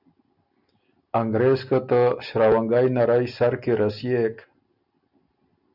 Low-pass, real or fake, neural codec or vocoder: 5.4 kHz; real; none